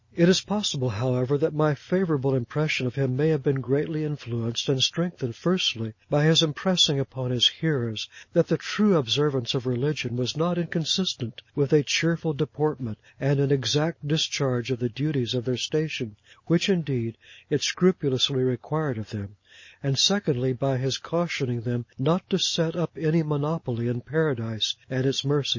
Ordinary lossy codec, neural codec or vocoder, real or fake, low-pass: MP3, 32 kbps; none; real; 7.2 kHz